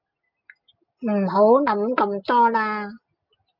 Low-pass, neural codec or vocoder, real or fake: 5.4 kHz; none; real